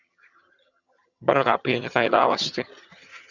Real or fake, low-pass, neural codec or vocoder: fake; 7.2 kHz; vocoder, 22.05 kHz, 80 mel bands, HiFi-GAN